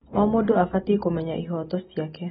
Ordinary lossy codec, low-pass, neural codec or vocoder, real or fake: AAC, 16 kbps; 19.8 kHz; none; real